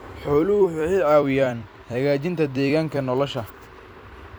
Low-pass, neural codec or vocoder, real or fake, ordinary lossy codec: none; vocoder, 44.1 kHz, 128 mel bands every 256 samples, BigVGAN v2; fake; none